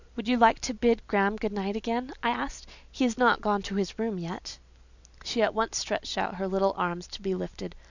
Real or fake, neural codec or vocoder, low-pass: real; none; 7.2 kHz